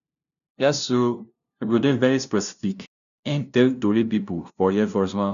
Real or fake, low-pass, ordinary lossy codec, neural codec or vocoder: fake; 7.2 kHz; none; codec, 16 kHz, 0.5 kbps, FunCodec, trained on LibriTTS, 25 frames a second